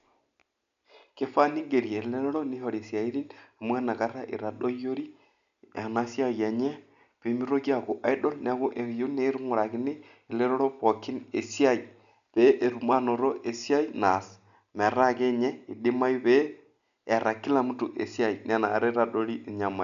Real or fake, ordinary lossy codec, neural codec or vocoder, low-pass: real; none; none; 7.2 kHz